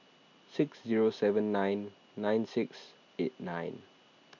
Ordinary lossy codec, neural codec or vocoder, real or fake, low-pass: none; none; real; 7.2 kHz